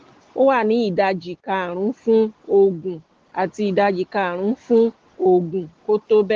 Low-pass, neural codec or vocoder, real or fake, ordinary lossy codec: 7.2 kHz; none; real; Opus, 16 kbps